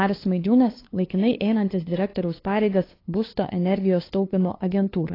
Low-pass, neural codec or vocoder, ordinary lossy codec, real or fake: 5.4 kHz; codec, 16 kHz, 2 kbps, FunCodec, trained on LibriTTS, 25 frames a second; AAC, 24 kbps; fake